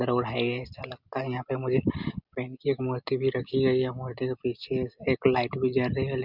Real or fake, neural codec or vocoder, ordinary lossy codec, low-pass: real; none; none; 5.4 kHz